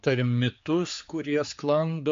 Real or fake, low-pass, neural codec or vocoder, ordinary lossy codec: fake; 7.2 kHz; codec, 16 kHz, 4 kbps, X-Codec, HuBERT features, trained on general audio; MP3, 48 kbps